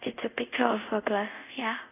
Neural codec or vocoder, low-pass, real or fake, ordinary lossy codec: codec, 24 kHz, 0.5 kbps, DualCodec; 3.6 kHz; fake; none